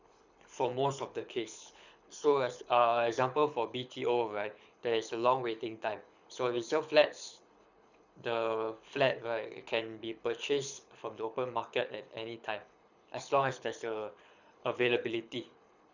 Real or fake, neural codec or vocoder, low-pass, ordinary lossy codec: fake; codec, 24 kHz, 6 kbps, HILCodec; 7.2 kHz; none